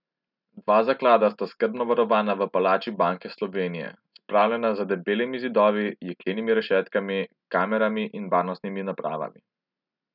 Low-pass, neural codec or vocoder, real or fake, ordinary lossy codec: 5.4 kHz; none; real; none